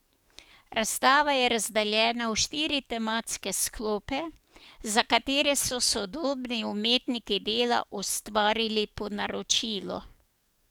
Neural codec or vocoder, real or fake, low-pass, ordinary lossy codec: codec, 44.1 kHz, 7.8 kbps, DAC; fake; none; none